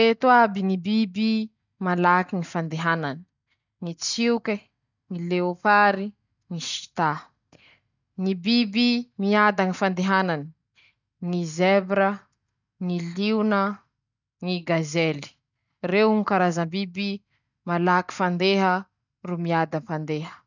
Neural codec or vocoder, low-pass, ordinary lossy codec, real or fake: none; 7.2 kHz; none; real